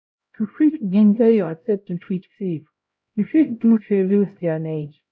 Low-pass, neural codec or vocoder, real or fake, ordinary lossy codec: none; codec, 16 kHz, 0.5 kbps, X-Codec, HuBERT features, trained on LibriSpeech; fake; none